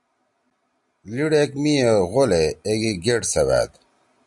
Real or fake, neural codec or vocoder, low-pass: real; none; 10.8 kHz